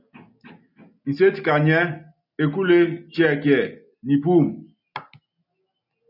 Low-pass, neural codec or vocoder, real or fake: 5.4 kHz; none; real